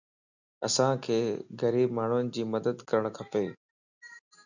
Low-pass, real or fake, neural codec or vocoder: 7.2 kHz; real; none